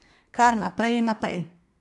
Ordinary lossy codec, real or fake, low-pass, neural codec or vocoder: none; fake; 10.8 kHz; codec, 24 kHz, 1 kbps, SNAC